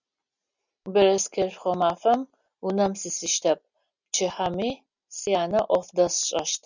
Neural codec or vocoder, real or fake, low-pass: none; real; 7.2 kHz